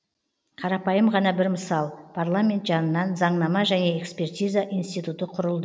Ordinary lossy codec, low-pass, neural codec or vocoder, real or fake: none; none; none; real